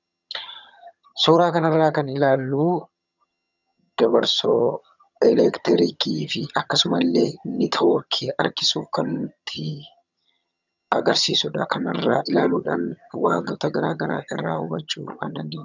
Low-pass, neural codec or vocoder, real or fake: 7.2 kHz; vocoder, 22.05 kHz, 80 mel bands, HiFi-GAN; fake